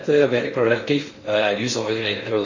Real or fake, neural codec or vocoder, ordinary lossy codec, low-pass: fake; codec, 16 kHz in and 24 kHz out, 0.6 kbps, FocalCodec, streaming, 4096 codes; MP3, 32 kbps; 7.2 kHz